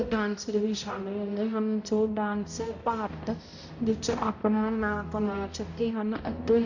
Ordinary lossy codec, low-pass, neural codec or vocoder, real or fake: none; 7.2 kHz; codec, 16 kHz, 0.5 kbps, X-Codec, HuBERT features, trained on balanced general audio; fake